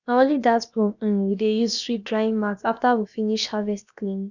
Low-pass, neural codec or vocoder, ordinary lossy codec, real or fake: 7.2 kHz; codec, 16 kHz, about 1 kbps, DyCAST, with the encoder's durations; none; fake